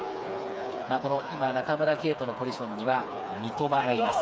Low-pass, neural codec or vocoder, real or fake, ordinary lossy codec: none; codec, 16 kHz, 4 kbps, FreqCodec, smaller model; fake; none